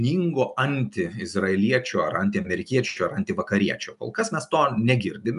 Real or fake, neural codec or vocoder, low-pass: real; none; 10.8 kHz